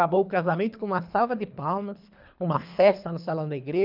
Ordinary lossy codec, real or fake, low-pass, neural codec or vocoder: none; fake; 5.4 kHz; codec, 24 kHz, 3 kbps, HILCodec